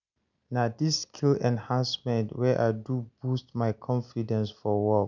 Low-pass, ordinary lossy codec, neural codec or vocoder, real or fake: 7.2 kHz; none; none; real